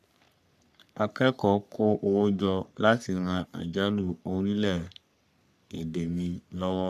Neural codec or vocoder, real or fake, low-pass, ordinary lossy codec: codec, 44.1 kHz, 3.4 kbps, Pupu-Codec; fake; 14.4 kHz; none